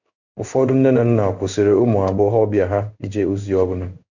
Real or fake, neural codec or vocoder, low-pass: fake; codec, 16 kHz in and 24 kHz out, 1 kbps, XY-Tokenizer; 7.2 kHz